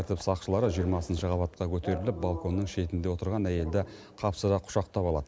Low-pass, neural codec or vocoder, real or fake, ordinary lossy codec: none; none; real; none